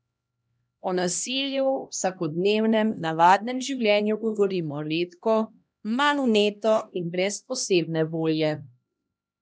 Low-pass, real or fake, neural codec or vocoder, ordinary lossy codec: none; fake; codec, 16 kHz, 1 kbps, X-Codec, HuBERT features, trained on LibriSpeech; none